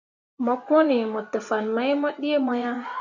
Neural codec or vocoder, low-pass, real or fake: vocoder, 24 kHz, 100 mel bands, Vocos; 7.2 kHz; fake